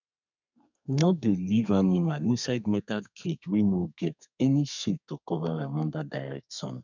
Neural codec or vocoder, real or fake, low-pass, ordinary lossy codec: codec, 32 kHz, 1.9 kbps, SNAC; fake; 7.2 kHz; none